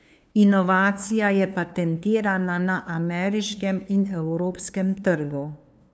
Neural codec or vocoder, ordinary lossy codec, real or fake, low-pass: codec, 16 kHz, 2 kbps, FunCodec, trained on LibriTTS, 25 frames a second; none; fake; none